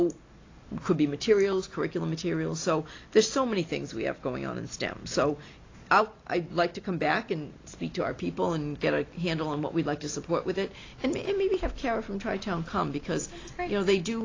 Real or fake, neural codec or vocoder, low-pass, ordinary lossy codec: real; none; 7.2 kHz; AAC, 32 kbps